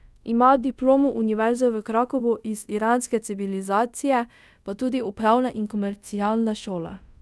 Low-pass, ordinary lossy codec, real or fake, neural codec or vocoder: none; none; fake; codec, 24 kHz, 0.5 kbps, DualCodec